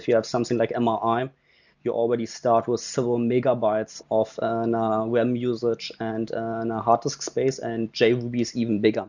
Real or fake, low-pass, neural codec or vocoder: real; 7.2 kHz; none